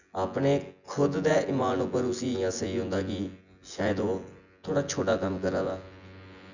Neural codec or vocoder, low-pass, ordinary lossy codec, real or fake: vocoder, 24 kHz, 100 mel bands, Vocos; 7.2 kHz; none; fake